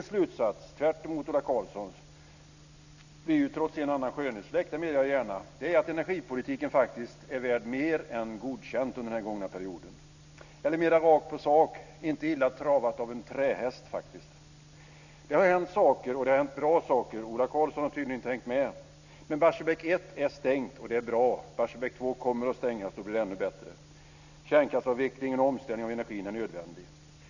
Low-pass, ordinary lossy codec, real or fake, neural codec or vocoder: 7.2 kHz; none; real; none